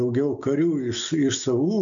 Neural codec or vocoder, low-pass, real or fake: none; 7.2 kHz; real